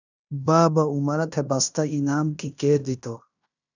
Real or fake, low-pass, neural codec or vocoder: fake; 7.2 kHz; codec, 16 kHz in and 24 kHz out, 0.9 kbps, LongCat-Audio-Codec, fine tuned four codebook decoder